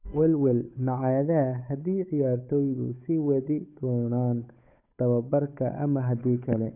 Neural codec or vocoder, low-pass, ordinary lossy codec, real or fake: codec, 16 kHz, 8 kbps, FunCodec, trained on Chinese and English, 25 frames a second; 3.6 kHz; none; fake